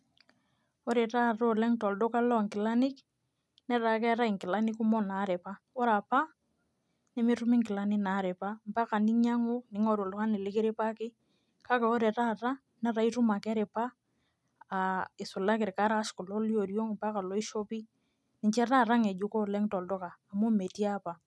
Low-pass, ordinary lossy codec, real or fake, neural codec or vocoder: none; none; real; none